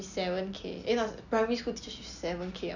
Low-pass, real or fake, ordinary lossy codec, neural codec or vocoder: 7.2 kHz; real; none; none